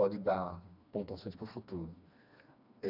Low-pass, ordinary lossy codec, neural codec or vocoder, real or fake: 5.4 kHz; none; codec, 16 kHz, 2 kbps, FreqCodec, smaller model; fake